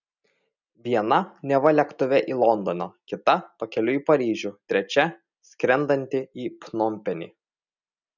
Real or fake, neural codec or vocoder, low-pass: real; none; 7.2 kHz